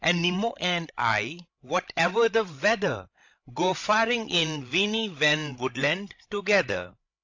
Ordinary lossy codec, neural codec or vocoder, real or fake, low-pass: AAC, 48 kbps; codec, 16 kHz, 16 kbps, FreqCodec, larger model; fake; 7.2 kHz